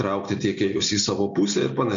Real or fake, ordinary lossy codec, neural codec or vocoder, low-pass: real; MP3, 64 kbps; none; 7.2 kHz